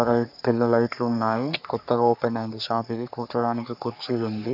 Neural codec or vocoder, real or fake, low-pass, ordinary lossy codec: codec, 44.1 kHz, 3.4 kbps, Pupu-Codec; fake; 5.4 kHz; none